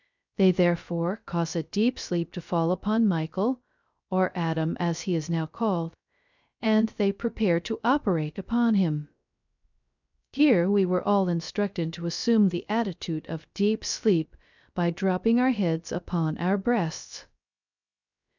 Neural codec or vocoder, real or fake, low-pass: codec, 16 kHz, 0.3 kbps, FocalCodec; fake; 7.2 kHz